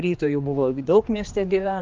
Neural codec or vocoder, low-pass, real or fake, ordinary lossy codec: codec, 16 kHz, 2 kbps, X-Codec, HuBERT features, trained on general audio; 7.2 kHz; fake; Opus, 24 kbps